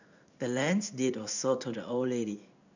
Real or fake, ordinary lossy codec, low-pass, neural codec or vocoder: fake; none; 7.2 kHz; codec, 16 kHz in and 24 kHz out, 1 kbps, XY-Tokenizer